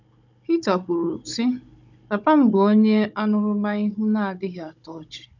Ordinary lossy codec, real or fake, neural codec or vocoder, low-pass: none; fake; codec, 16 kHz, 16 kbps, FunCodec, trained on Chinese and English, 50 frames a second; 7.2 kHz